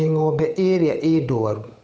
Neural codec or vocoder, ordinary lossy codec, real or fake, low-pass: codec, 16 kHz, 8 kbps, FunCodec, trained on Chinese and English, 25 frames a second; none; fake; none